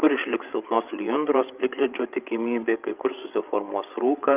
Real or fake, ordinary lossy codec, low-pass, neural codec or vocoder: fake; Opus, 24 kbps; 3.6 kHz; codec, 16 kHz, 8 kbps, FreqCodec, larger model